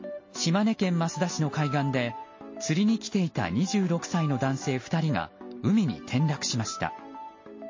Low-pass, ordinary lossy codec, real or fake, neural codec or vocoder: 7.2 kHz; MP3, 32 kbps; real; none